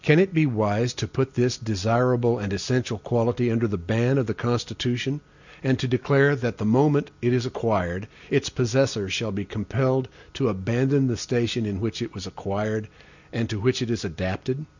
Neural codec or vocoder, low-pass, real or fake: none; 7.2 kHz; real